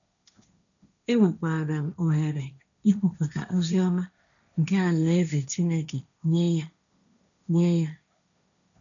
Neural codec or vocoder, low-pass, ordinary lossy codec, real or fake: codec, 16 kHz, 1.1 kbps, Voila-Tokenizer; 7.2 kHz; none; fake